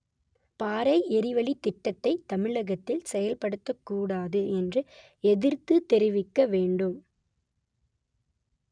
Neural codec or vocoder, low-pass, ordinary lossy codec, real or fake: none; 9.9 kHz; none; real